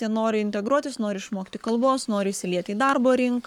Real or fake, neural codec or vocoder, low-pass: fake; codec, 44.1 kHz, 7.8 kbps, Pupu-Codec; 19.8 kHz